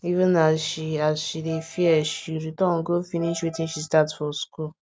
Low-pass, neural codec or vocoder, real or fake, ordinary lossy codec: none; none; real; none